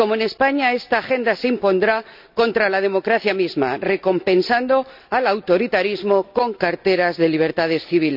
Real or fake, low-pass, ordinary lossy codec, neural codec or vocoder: real; 5.4 kHz; none; none